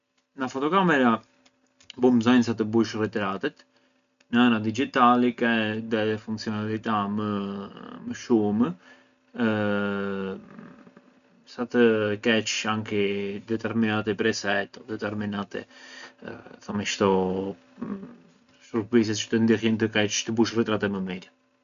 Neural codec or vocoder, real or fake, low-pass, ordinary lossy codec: none; real; 7.2 kHz; none